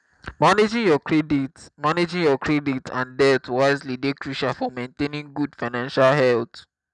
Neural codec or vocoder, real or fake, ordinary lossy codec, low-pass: none; real; none; 10.8 kHz